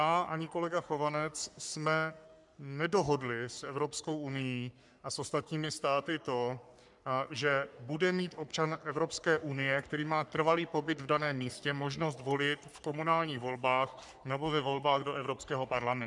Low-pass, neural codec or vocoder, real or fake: 10.8 kHz; codec, 44.1 kHz, 3.4 kbps, Pupu-Codec; fake